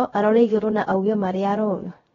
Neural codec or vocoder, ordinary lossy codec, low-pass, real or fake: codec, 16 kHz, 0.3 kbps, FocalCodec; AAC, 24 kbps; 7.2 kHz; fake